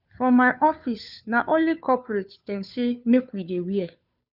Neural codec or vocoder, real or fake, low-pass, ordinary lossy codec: codec, 16 kHz, 2 kbps, FunCodec, trained on Chinese and English, 25 frames a second; fake; 5.4 kHz; none